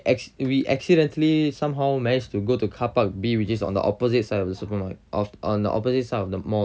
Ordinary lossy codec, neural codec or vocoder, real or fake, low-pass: none; none; real; none